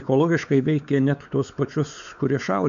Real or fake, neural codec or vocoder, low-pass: fake; codec, 16 kHz, 4 kbps, X-Codec, WavLM features, trained on Multilingual LibriSpeech; 7.2 kHz